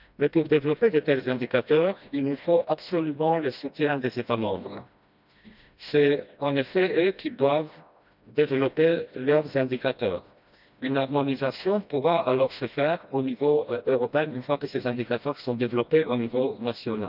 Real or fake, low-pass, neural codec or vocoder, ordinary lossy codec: fake; 5.4 kHz; codec, 16 kHz, 1 kbps, FreqCodec, smaller model; none